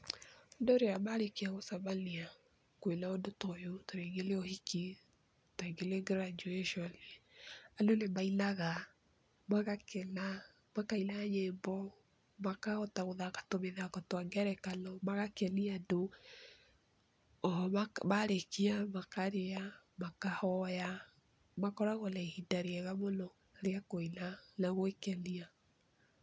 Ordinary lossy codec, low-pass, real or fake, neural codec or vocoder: none; none; real; none